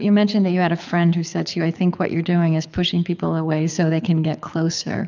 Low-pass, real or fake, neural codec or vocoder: 7.2 kHz; fake; codec, 24 kHz, 6 kbps, HILCodec